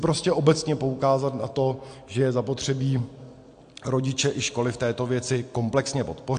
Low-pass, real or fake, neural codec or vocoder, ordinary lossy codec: 9.9 kHz; real; none; AAC, 64 kbps